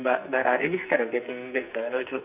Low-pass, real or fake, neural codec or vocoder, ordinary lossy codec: 3.6 kHz; fake; codec, 44.1 kHz, 2.6 kbps, SNAC; none